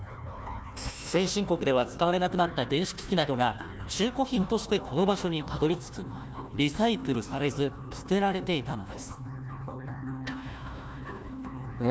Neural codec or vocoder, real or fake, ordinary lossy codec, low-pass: codec, 16 kHz, 1 kbps, FunCodec, trained on Chinese and English, 50 frames a second; fake; none; none